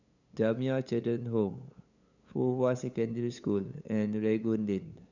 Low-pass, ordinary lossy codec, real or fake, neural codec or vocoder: 7.2 kHz; none; fake; codec, 16 kHz, 8 kbps, FunCodec, trained on LibriTTS, 25 frames a second